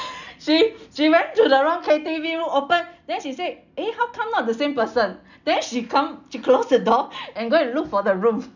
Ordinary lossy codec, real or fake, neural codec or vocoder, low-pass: none; real; none; 7.2 kHz